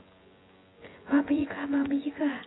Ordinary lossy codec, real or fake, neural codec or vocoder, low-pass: AAC, 16 kbps; fake; vocoder, 24 kHz, 100 mel bands, Vocos; 7.2 kHz